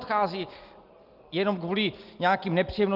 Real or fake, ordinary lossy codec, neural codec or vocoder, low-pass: real; Opus, 32 kbps; none; 5.4 kHz